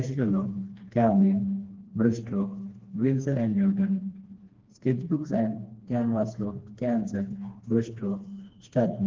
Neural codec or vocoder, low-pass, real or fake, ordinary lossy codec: codec, 16 kHz, 2 kbps, FreqCodec, smaller model; 7.2 kHz; fake; Opus, 16 kbps